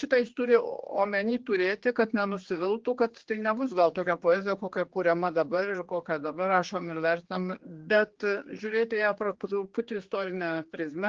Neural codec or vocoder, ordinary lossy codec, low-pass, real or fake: codec, 16 kHz, 2 kbps, X-Codec, HuBERT features, trained on general audio; Opus, 16 kbps; 7.2 kHz; fake